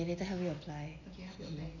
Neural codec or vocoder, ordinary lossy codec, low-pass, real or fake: none; none; 7.2 kHz; real